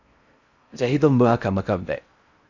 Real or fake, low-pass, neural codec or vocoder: fake; 7.2 kHz; codec, 16 kHz in and 24 kHz out, 0.6 kbps, FocalCodec, streaming, 4096 codes